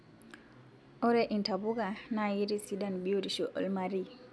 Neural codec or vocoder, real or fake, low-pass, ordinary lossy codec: none; real; none; none